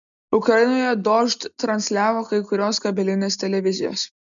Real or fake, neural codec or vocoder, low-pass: real; none; 7.2 kHz